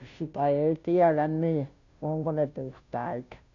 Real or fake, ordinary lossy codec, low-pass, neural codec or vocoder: fake; none; 7.2 kHz; codec, 16 kHz, 0.5 kbps, FunCodec, trained on Chinese and English, 25 frames a second